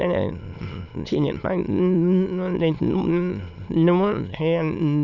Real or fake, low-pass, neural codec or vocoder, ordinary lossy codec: fake; 7.2 kHz; autoencoder, 22.05 kHz, a latent of 192 numbers a frame, VITS, trained on many speakers; none